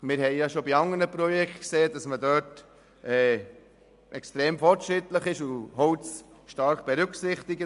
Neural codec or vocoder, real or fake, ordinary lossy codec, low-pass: none; real; MP3, 96 kbps; 10.8 kHz